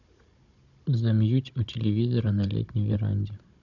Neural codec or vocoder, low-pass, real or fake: codec, 16 kHz, 16 kbps, FunCodec, trained on Chinese and English, 50 frames a second; 7.2 kHz; fake